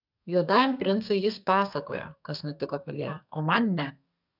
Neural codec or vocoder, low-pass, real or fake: codec, 32 kHz, 1.9 kbps, SNAC; 5.4 kHz; fake